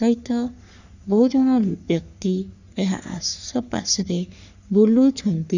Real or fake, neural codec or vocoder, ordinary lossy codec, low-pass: fake; codec, 44.1 kHz, 3.4 kbps, Pupu-Codec; none; 7.2 kHz